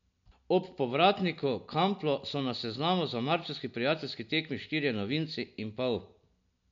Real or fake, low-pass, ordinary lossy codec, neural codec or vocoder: real; 7.2 kHz; MP3, 64 kbps; none